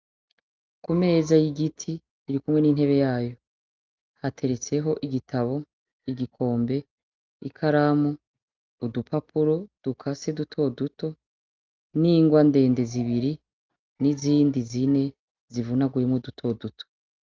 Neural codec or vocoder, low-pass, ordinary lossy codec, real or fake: none; 7.2 kHz; Opus, 32 kbps; real